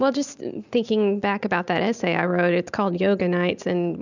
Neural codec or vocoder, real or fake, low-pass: none; real; 7.2 kHz